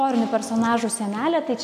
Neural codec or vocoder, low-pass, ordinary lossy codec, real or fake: none; 14.4 kHz; AAC, 64 kbps; real